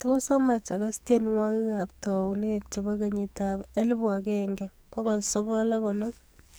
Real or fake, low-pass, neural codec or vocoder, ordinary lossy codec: fake; none; codec, 44.1 kHz, 2.6 kbps, SNAC; none